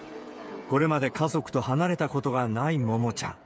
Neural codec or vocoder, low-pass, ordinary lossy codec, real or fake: codec, 16 kHz, 8 kbps, FreqCodec, smaller model; none; none; fake